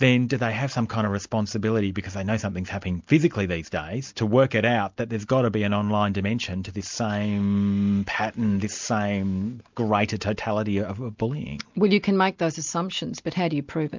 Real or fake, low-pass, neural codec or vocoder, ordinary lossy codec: real; 7.2 kHz; none; MP3, 64 kbps